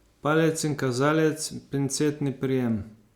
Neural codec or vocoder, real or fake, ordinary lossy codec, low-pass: none; real; Opus, 64 kbps; 19.8 kHz